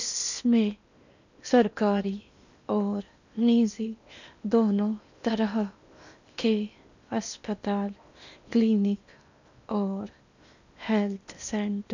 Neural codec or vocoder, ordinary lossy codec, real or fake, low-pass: codec, 16 kHz in and 24 kHz out, 0.8 kbps, FocalCodec, streaming, 65536 codes; none; fake; 7.2 kHz